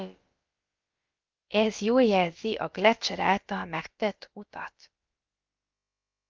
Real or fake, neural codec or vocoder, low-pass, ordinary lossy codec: fake; codec, 16 kHz, about 1 kbps, DyCAST, with the encoder's durations; 7.2 kHz; Opus, 24 kbps